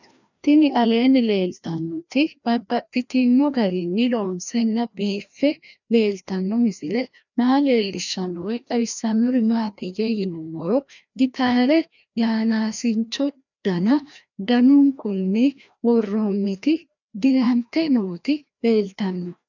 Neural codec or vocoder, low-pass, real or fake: codec, 16 kHz, 1 kbps, FreqCodec, larger model; 7.2 kHz; fake